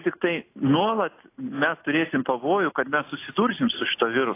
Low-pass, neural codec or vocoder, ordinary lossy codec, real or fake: 3.6 kHz; none; AAC, 24 kbps; real